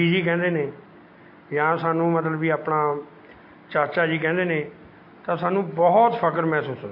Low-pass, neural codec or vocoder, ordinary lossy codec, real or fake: 5.4 kHz; none; MP3, 32 kbps; real